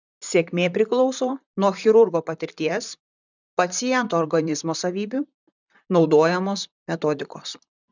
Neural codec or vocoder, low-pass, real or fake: vocoder, 44.1 kHz, 128 mel bands, Pupu-Vocoder; 7.2 kHz; fake